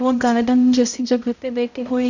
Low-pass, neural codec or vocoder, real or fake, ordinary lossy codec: 7.2 kHz; codec, 16 kHz, 0.5 kbps, X-Codec, HuBERT features, trained on balanced general audio; fake; none